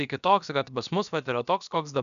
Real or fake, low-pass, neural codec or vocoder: fake; 7.2 kHz; codec, 16 kHz, about 1 kbps, DyCAST, with the encoder's durations